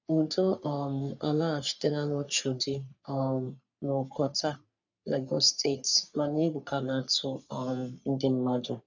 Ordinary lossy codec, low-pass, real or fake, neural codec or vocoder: none; 7.2 kHz; fake; codec, 44.1 kHz, 3.4 kbps, Pupu-Codec